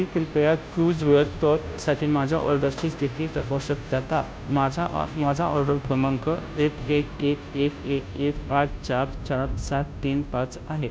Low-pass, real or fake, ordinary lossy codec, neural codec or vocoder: none; fake; none; codec, 16 kHz, 0.5 kbps, FunCodec, trained on Chinese and English, 25 frames a second